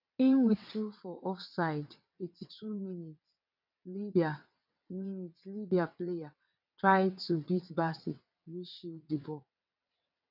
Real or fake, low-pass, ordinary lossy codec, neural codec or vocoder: fake; 5.4 kHz; none; vocoder, 22.05 kHz, 80 mel bands, WaveNeXt